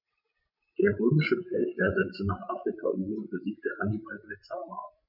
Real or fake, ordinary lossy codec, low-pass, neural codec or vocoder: fake; none; 5.4 kHz; codec, 16 kHz, 16 kbps, FreqCodec, larger model